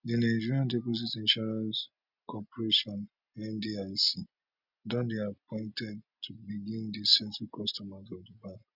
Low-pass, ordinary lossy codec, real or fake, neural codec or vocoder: 5.4 kHz; none; real; none